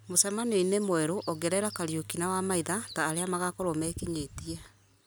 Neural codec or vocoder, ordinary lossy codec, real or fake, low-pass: none; none; real; none